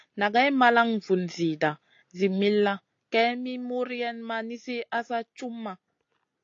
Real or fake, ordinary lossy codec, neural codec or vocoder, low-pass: real; AAC, 48 kbps; none; 7.2 kHz